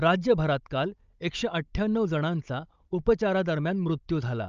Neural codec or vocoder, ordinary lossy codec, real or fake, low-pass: codec, 16 kHz, 16 kbps, FunCodec, trained on Chinese and English, 50 frames a second; Opus, 24 kbps; fake; 7.2 kHz